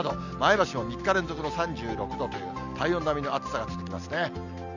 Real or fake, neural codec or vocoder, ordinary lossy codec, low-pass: real; none; none; 7.2 kHz